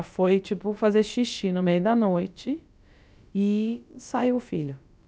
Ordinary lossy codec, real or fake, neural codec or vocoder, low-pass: none; fake; codec, 16 kHz, about 1 kbps, DyCAST, with the encoder's durations; none